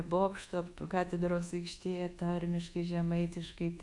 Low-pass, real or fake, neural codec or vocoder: 10.8 kHz; fake; codec, 24 kHz, 1.2 kbps, DualCodec